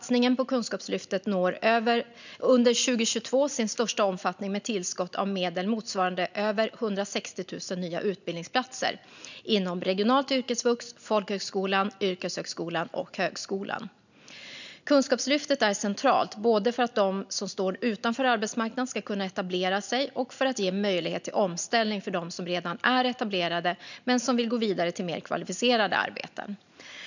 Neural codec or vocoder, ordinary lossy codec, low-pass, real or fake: none; none; 7.2 kHz; real